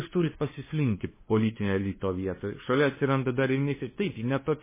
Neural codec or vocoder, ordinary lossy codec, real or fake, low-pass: codec, 16 kHz, 2 kbps, FunCodec, trained on LibriTTS, 25 frames a second; MP3, 16 kbps; fake; 3.6 kHz